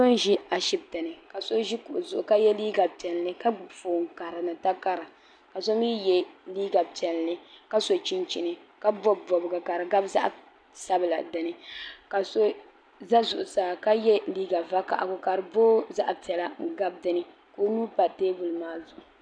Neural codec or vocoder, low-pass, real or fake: none; 9.9 kHz; real